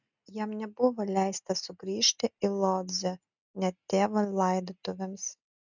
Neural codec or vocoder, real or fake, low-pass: none; real; 7.2 kHz